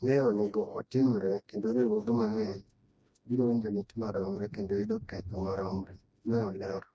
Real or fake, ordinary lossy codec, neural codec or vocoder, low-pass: fake; none; codec, 16 kHz, 1 kbps, FreqCodec, smaller model; none